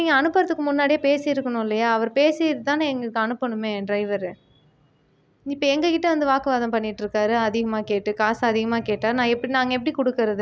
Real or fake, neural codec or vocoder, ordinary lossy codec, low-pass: real; none; none; none